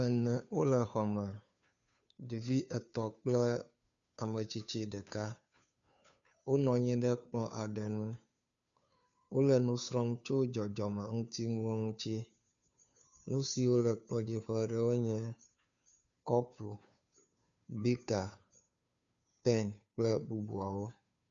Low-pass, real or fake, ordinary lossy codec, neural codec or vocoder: 7.2 kHz; fake; MP3, 96 kbps; codec, 16 kHz, 2 kbps, FunCodec, trained on Chinese and English, 25 frames a second